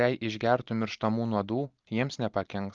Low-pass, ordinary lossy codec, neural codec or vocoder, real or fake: 7.2 kHz; Opus, 32 kbps; none; real